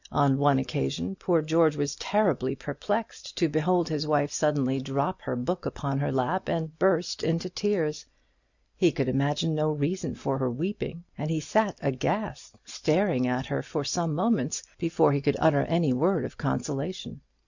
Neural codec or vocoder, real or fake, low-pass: none; real; 7.2 kHz